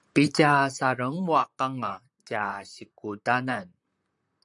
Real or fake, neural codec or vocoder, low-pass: fake; vocoder, 44.1 kHz, 128 mel bands, Pupu-Vocoder; 10.8 kHz